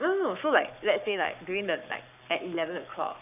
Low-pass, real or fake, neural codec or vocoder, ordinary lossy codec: 3.6 kHz; fake; codec, 44.1 kHz, 7.8 kbps, Pupu-Codec; none